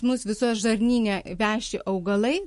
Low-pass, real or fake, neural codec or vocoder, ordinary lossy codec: 14.4 kHz; real; none; MP3, 48 kbps